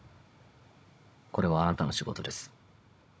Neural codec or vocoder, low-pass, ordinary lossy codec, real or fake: codec, 16 kHz, 16 kbps, FunCodec, trained on Chinese and English, 50 frames a second; none; none; fake